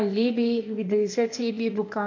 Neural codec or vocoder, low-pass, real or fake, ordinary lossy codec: codec, 16 kHz, 0.8 kbps, ZipCodec; 7.2 kHz; fake; MP3, 48 kbps